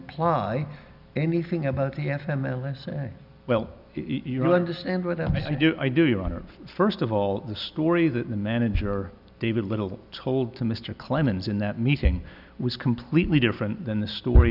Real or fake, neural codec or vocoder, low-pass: real; none; 5.4 kHz